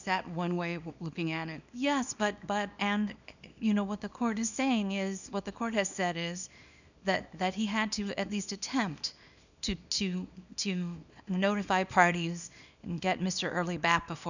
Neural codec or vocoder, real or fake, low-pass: codec, 24 kHz, 0.9 kbps, WavTokenizer, small release; fake; 7.2 kHz